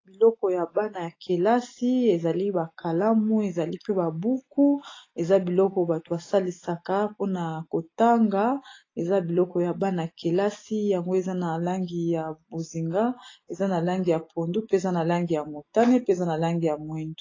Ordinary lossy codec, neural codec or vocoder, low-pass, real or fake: AAC, 32 kbps; none; 7.2 kHz; real